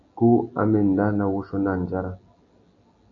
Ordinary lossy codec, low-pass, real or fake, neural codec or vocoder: AAC, 48 kbps; 7.2 kHz; real; none